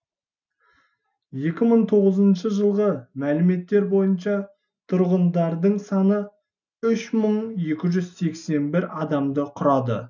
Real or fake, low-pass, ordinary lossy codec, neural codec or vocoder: real; 7.2 kHz; none; none